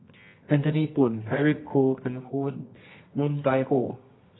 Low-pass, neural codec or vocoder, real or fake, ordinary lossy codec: 7.2 kHz; codec, 24 kHz, 0.9 kbps, WavTokenizer, medium music audio release; fake; AAC, 16 kbps